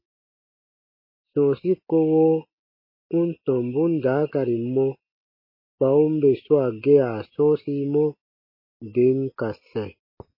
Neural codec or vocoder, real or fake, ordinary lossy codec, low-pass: none; real; MP3, 24 kbps; 5.4 kHz